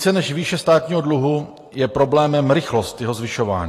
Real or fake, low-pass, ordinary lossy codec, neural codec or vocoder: fake; 14.4 kHz; AAC, 48 kbps; vocoder, 44.1 kHz, 128 mel bands every 512 samples, BigVGAN v2